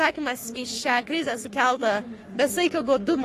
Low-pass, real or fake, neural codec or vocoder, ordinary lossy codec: 14.4 kHz; fake; codec, 44.1 kHz, 3.4 kbps, Pupu-Codec; AAC, 48 kbps